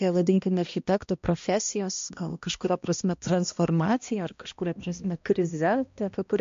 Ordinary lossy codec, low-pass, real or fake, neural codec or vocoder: MP3, 48 kbps; 7.2 kHz; fake; codec, 16 kHz, 1 kbps, X-Codec, HuBERT features, trained on balanced general audio